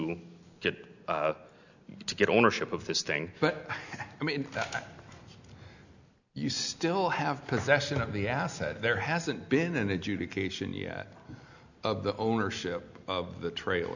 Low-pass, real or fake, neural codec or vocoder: 7.2 kHz; real; none